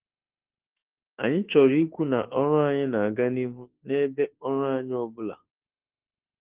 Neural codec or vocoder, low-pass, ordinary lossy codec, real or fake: autoencoder, 48 kHz, 32 numbers a frame, DAC-VAE, trained on Japanese speech; 3.6 kHz; Opus, 16 kbps; fake